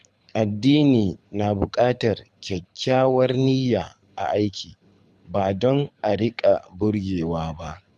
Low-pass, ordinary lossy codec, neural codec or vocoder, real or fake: none; none; codec, 24 kHz, 6 kbps, HILCodec; fake